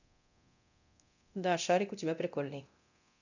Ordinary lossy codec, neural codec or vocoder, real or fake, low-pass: none; codec, 24 kHz, 0.9 kbps, DualCodec; fake; 7.2 kHz